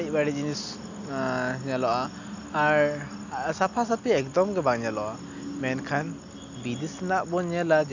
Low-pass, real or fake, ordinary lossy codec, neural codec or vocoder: 7.2 kHz; real; none; none